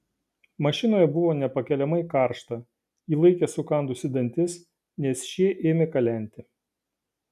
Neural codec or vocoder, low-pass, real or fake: none; 14.4 kHz; real